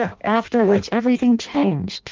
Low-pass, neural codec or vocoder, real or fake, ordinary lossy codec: 7.2 kHz; codec, 16 kHz in and 24 kHz out, 0.6 kbps, FireRedTTS-2 codec; fake; Opus, 32 kbps